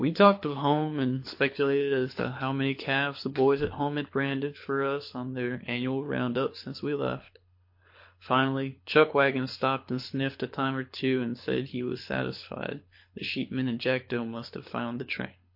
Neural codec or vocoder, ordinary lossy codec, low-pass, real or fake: codec, 24 kHz, 6 kbps, HILCodec; MP3, 32 kbps; 5.4 kHz; fake